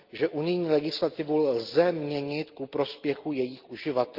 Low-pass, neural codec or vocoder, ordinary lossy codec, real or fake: 5.4 kHz; none; Opus, 32 kbps; real